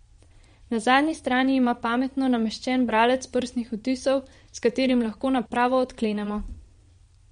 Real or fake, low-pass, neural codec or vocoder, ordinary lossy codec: fake; 9.9 kHz; vocoder, 22.05 kHz, 80 mel bands, Vocos; MP3, 48 kbps